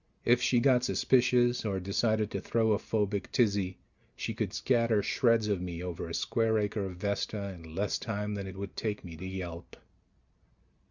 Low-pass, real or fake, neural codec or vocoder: 7.2 kHz; real; none